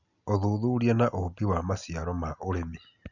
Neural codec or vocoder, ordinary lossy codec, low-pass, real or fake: none; none; 7.2 kHz; real